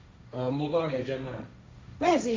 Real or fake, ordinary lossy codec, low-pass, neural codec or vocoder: fake; none; none; codec, 16 kHz, 1.1 kbps, Voila-Tokenizer